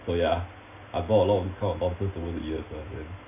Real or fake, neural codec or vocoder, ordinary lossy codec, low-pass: real; none; none; 3.6 kHz